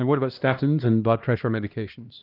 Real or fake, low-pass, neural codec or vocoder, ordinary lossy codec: fake; 5.4 kHz; codec, 16 kHz, 1 kbps, X-Codec, HuBERT features, trained on LibriSpeech; Opus, 32 kbps